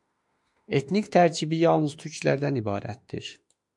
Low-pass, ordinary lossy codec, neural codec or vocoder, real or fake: 10.8 kHz; MP3, 64 kbps; autoencoder, 48 kHz, 32 numbers a frame, DAC-VAE, trained on Japanese speech; fake